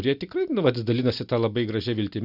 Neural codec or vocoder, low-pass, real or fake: none; 5.4 kHz; real